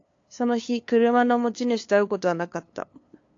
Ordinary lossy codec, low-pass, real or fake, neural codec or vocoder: AAC, 48 kbps; 7.2 kHz; fake; codec, 16 kHz, 2 kbps, FunCodec, trained on LibriTTS, 25 frames a second